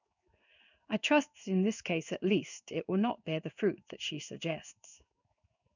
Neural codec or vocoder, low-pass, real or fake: codec, 16 kHz in and 24 kHz out, 1 kbps, XY-Tokenizer; 7.2 kHz; fake